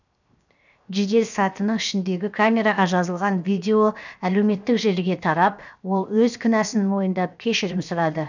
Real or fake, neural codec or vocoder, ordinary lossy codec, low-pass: fake; codec, 16 kHz, 0.7 kbps, FocalCodec; none; 7.2 kHz